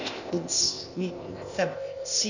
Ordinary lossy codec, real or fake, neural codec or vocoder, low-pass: none; fake; codec, 16 kHz, 0.8 kbps, ZipCodec; 7.2 kHz